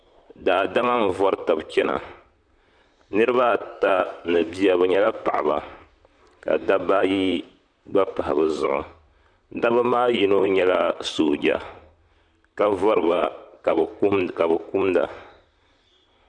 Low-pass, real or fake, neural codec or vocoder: 9.9 kHz; fake; vocoder, 44.1 kHz, 128 mel bands, Pupu-Vocoder